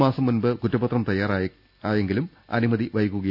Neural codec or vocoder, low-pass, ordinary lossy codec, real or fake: none; 5.4 kHz; none; real